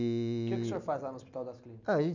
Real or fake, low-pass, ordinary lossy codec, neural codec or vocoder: real; 7.2 kHz; none; none